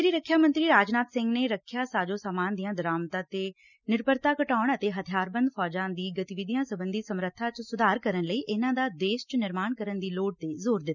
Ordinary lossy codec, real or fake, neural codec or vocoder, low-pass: none; real; none; 7.2 kHz